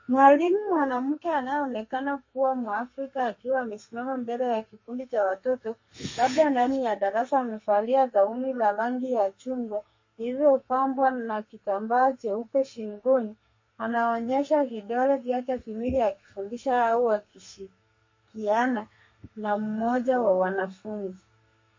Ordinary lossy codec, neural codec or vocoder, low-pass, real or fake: MP3, 32 kbps; codec, 32 kHz, 1.9 kbps, SNAC; 7.2 kHz; fake